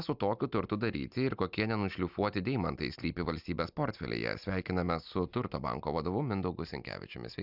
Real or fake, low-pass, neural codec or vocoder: real; 5.4 kHz; none